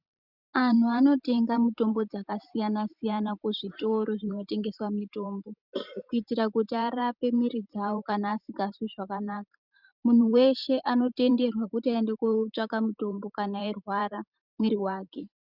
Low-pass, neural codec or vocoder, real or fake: 5.4 kHz; vocoder, 44.1 kHz, 128 mel bands every 512 samples, BigVGAN v2; fake